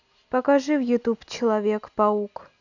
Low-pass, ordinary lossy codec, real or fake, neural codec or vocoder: 7.2 kHz; none; real; none